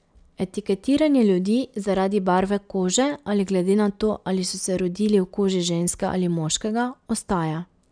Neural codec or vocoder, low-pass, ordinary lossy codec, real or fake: none; 9.9 kHz; none; real